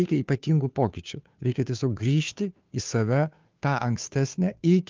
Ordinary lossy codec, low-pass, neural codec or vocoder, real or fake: Opus, 24 kbps; 7.2 kHz; codec, 16 kHz, 2 kbps, FunCodec, trained on Chinese and English, 25 frames a second; fake